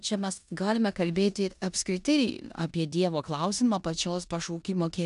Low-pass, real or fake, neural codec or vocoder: 10.8 kHz; fake; codec, 16 kHz in and 24 kHz out, 0.9 kbps, LongCat-Audio-Codec, four codebook decoder